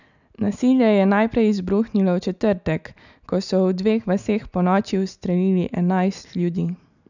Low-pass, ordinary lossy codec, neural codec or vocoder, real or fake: 7.2 kHz; none; none; real